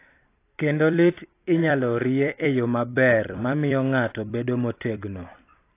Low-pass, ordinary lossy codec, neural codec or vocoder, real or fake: 3.6 kHz; AAC, 24 kbps; vocoder, 44.1 kHz, 128 mel bands every 256 samples, BigVGAN v2; fake